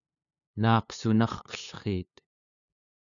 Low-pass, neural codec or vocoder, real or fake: 7.2 kHz; codec, 16 kHz, 2 kbps, FunCodec, trained on LibriTTS, 25 frames a second; fake